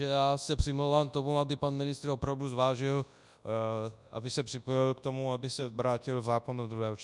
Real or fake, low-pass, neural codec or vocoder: fake; 10.8 kHz; codec, 24 kHz, 0.9 kbps, WavTokenizer, large speech release